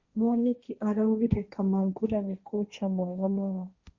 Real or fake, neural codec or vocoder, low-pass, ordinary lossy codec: fake; codec, 16 kHz, 1.1 kbps, Voila-Tokenizer; 7.2 kHz; Opus, 64 kbps